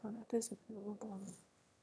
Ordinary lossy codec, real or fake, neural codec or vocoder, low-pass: none; fake; autoencoder, 22.05 kHz, a latent of 192 numbers a frame, VITS, trained on one speaker; none